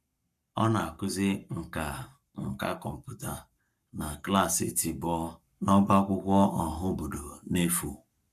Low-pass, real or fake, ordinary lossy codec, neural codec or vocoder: 14.4 kHz; fake; none; codec, 44.1 kHz, 7.8 kbps, Pupu-Codec